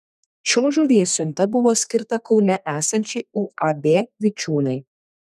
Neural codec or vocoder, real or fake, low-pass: codec, 32 kHz, 1.9 kbps, SNAC; fake; 14.4 kHz